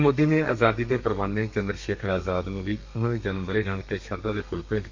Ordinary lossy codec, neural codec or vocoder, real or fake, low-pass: MP3, 48 kbps; codec, 32 kHz, 1.9 kbps, SNAC; fake; 7.2 kHz